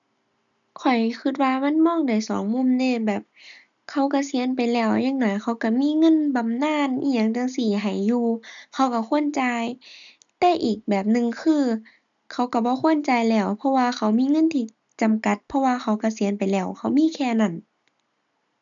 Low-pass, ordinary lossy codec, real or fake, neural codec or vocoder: 7.2 kHz; none; real; none